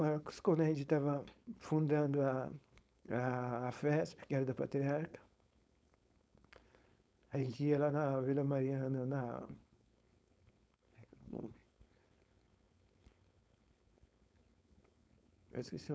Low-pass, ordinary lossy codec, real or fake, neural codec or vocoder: none; none; fake; codec, 16 kHz, 4.8 kbps, FACodec